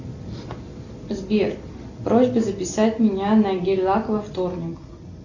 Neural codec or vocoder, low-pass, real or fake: none; 7.2 kHz; real